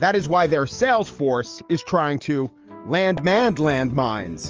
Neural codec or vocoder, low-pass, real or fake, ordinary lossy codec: codec, 44.1 kHz, 7.8 kbps, DAC; 7.2 kHz; fake; Opus, 24 kbps